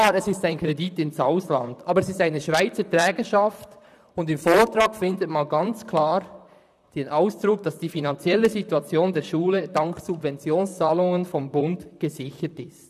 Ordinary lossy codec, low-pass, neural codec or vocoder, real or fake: none; 14.4 kHz; vocoder, 44.1 kHz, 128 mel bands, Pupu-Vocoder; fake